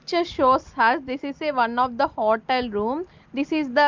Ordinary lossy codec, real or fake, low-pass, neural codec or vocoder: Opus, 32 kbps; real; 7.2 kHz; none